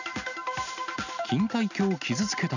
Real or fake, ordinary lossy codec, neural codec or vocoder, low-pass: real; none; none; 7.2 kHz